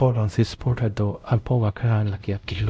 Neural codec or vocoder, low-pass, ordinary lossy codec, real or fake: codec, 16 kHz, 0.5 kbps, X-Codec, WavLM features, trained on Multilingual LibriSpeech; none; none; fake